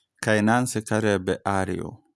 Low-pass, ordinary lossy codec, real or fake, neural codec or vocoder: none; none; real; none